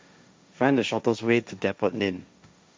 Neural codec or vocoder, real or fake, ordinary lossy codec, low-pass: codec, 16 kHz, 1.1 kbps, Voila-Tokenizer; fake; none; none